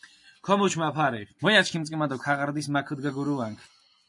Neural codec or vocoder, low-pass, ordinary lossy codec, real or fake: none; 10.8 kHz; MP3, 48 kbps; real